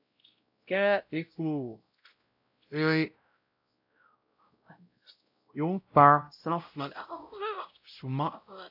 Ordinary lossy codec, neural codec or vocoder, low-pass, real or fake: AAC, 48 kbps; codec, 16 kHz, 0.5 kbps, X-Codec, WavLM features, trained on Multilingual LibriSpeech; 5.4 kHz; fake